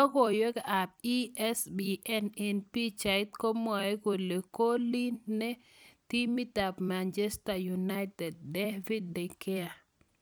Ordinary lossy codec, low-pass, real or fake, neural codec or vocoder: none; none; fake; vocoder, 44.1 kHz, 128 mel bands every 256 samples, BigVGAN v2